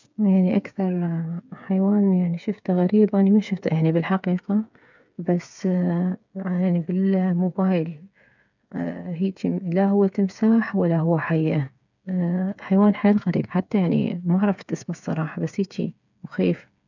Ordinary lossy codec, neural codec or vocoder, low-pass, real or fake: none; codec, 16 kHz, 8 kbps, FreqCodec, smaller model; 7.2 kHz; fake